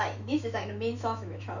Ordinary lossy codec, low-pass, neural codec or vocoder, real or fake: none; 7.2 kHz; autoencoder, 48 kHz, 128 numbers a frame, DAC-VAE, trained on Japanese speech; fake